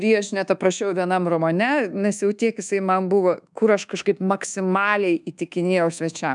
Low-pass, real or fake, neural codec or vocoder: 10.8 kHz; fake; codec, 24 kHz, 1.2 kbps, DualCodec